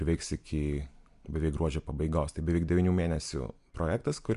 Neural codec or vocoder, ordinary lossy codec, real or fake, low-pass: none; MP3, 64 kbps; real; 10.8 kHz